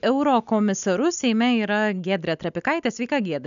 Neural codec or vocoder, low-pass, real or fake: none; 7.2 kHz; real